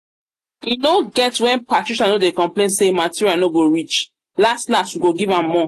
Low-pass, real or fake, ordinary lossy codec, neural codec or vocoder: 14.4 kHz; real; AAC, 48 kbps; none